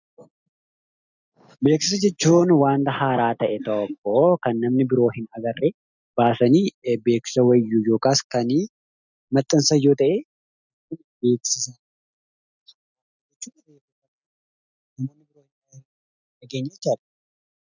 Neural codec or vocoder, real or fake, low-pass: none; real; 7.2 kHz